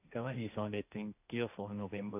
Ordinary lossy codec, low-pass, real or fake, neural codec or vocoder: AAC, 32 kbps; 3.6 kHz; fake; codec, 16 kHz, 1.1 kbps, Voila-Tokenizer